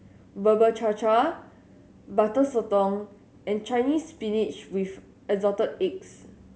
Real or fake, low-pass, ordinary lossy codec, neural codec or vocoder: real; none; none; none